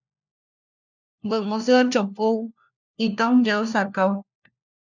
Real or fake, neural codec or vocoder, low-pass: fake; codec, 16 kHz, 1 kbps, FunCodec, trained on LibriTTS, 50 frames a second; 7.2 kHz